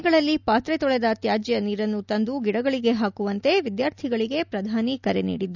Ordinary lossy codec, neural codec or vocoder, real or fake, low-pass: none; none; real; 7.2 kHz